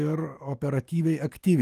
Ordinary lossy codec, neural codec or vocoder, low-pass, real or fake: Opus, 32 kbps; autoencoder, 48 kHz, 128 numbers a frame, DAC-VAE, trained on Japanese speech; 14.4 kHz; fake